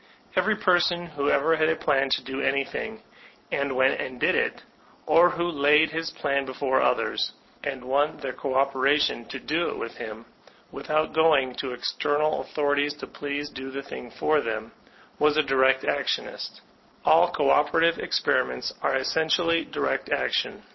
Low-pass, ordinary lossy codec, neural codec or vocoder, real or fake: 7.2 kHz; MP3, 24 kbps; none; real